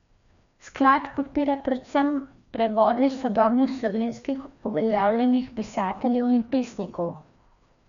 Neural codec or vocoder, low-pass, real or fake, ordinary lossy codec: codec, 16 kHz, 1 kbps, FreqCodec, larger model; 7.2 kHz; fake; none